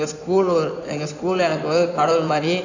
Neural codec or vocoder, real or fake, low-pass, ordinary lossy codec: codec, 16 kHz in and 24 kHz out, 2.2 kbps, FireRedTTS-2 codec; fake; 7.2 kHz; none